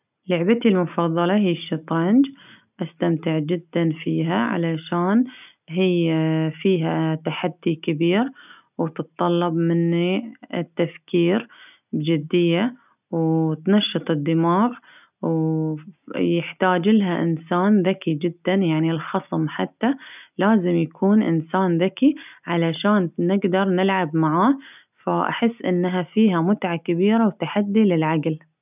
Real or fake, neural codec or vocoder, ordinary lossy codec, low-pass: real; none; none; 3.6 kHz